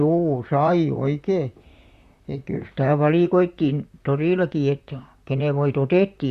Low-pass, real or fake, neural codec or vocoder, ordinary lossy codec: 14.4 kHz; fake; vocoder, 44.1 kHz, 128 mel bands, Pupu-Vocoder; Opus, 64 kbps